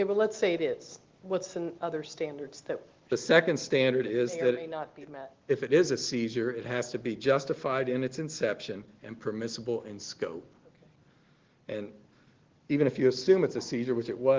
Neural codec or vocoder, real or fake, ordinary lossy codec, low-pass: none; real; Opus, 16 kbps; 7.2 kHz